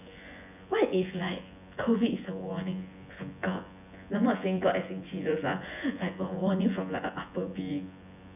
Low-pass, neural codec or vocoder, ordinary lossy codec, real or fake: 3.6 kHz; vocoder, 24 kHz, 100 mel bands, Vocos; none; fake